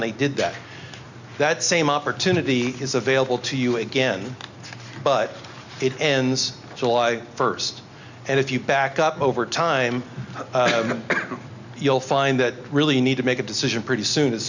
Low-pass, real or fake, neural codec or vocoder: 7.2 kHz; real; none